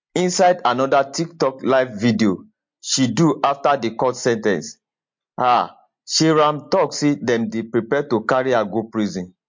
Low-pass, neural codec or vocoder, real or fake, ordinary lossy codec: 7.2 kHz; none; real; MP3, 48 kbps